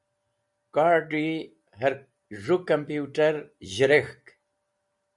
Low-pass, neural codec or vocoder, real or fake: 10.8 kHz; none; real